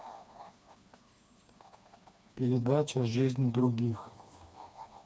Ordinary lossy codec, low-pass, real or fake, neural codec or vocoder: none; none; fake; codec, 16 kHz, 2 kbps, FreqCodec, smaller model